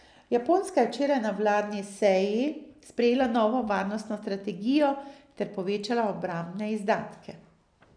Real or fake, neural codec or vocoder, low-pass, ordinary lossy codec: real; none; 9.9 kHz; none